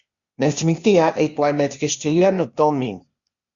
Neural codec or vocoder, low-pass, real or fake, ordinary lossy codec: codec, 16 kHz, 0.8 kbps, ZipCodec; 7.2 kHz; fake; Opus, 64 kbps